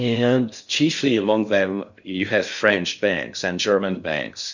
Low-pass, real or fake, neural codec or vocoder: 7.2 kHz; fake; codec, 16 kHz in and 24 kHz out, 0.6 kbps, FocalCodec, streaming, 4096 codes